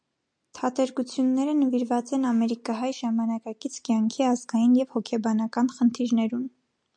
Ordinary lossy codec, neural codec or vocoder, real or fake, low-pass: MP3, 64 kbps; none; real; 9.9 kHz